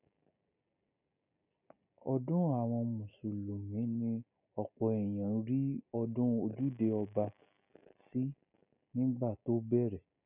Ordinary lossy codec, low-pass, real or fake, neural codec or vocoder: none; 3.6 kHz; real; none